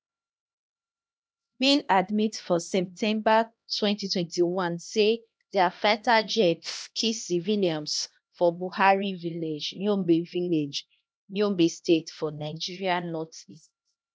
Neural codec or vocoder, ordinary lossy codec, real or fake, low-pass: codec, 16 kHz, 1 kbps, X-Codec, HuBERT features, trained on LibriSpeech; none; fake; none